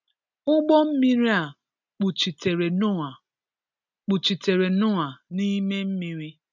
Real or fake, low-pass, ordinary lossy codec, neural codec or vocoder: real; 7.2 kHz; none; none